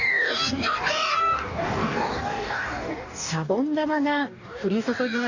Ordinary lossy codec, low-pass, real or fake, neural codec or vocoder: none; 7.2 kHz; fake; codec, 44.1 kHz, 2.6 kbps, DAC